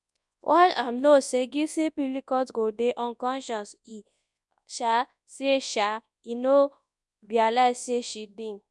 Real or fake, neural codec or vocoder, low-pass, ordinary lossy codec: fake; codec, 24 kHz, 0.9 kbps, WavTokenizer, large speech release; 10.8 kHz; none